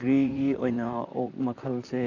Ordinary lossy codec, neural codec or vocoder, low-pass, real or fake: none; vocoder, 44.1 kHz, 128 mel bands, Pupu-Vocoder; 7.2 kHz; fake